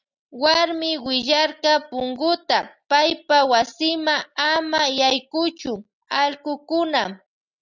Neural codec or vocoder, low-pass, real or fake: none; 7.2 kHz; real